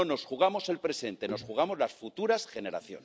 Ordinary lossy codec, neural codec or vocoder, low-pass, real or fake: none; none; none; real